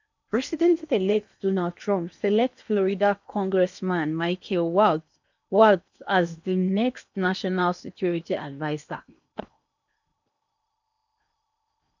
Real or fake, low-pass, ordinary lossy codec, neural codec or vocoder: fake; 7.2 kHz; none; codec, 16 kHz in and 24 kHz out, 0.8 kbps, FocalCodec, streaming, 65536 codes